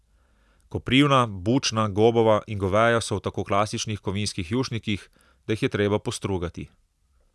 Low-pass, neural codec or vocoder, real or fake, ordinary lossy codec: none; none; real; none